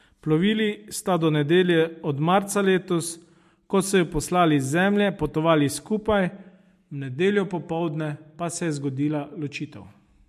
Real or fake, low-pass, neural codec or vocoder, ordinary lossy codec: real; 14.4 kHz; none; MP3, 64 kbps